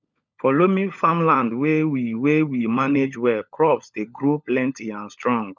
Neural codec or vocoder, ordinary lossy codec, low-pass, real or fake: codec, 16 kHz, 16 kbps, FunCodec, trained on LibriTTS, 50 frames a second; none; 7.2 kHz; fake